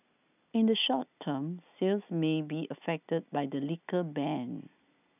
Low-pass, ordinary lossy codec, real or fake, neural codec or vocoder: 3.6 kHz; none; real; none